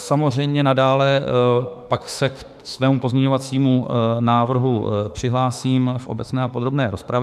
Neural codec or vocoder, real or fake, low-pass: autoencoder, 48 kHz, 32 numbers a frame, DAC-VAE, trained on Japanese speech; fake; 14.4 kHz